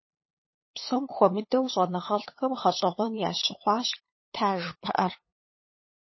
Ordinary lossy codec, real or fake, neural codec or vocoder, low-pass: MP3, 24 kbps; fake; codec, 16 kHz, 8 kbps, FunCodec, trained on LibriTTS, 25 frames a second; 7.2 kHz